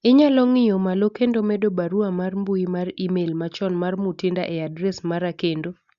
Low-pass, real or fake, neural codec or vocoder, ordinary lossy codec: 7.2 kHz; real; none; Opus, 64 kbps